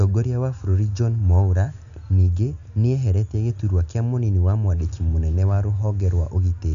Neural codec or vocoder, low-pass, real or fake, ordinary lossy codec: none; 7.2 kHz; real; none